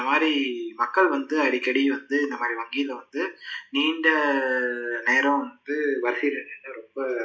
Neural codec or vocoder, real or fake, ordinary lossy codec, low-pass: none; real; none; none